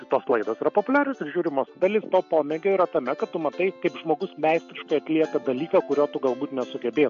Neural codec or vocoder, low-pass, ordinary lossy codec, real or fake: none; 7.2 kHz; AAC, 64 kbps; real